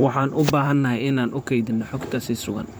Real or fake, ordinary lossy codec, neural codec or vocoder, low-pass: fake; none; vocoder, 44.1 kHz, 128 mel bands, Pupu-Vocoder; none